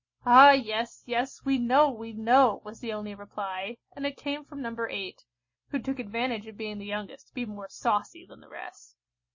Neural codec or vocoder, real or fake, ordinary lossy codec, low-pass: none; real; MP3, 32 kbps; 7.2 kHz